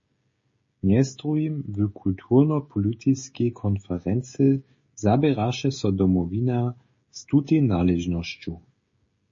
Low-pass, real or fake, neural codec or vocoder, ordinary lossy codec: 7.2 kHz; fake; codec, 16 kHz, 16 kbps, FreqCodec, smaller model; MP3, 32 kbps